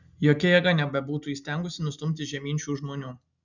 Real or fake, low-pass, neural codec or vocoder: real; 7.2 kHz; none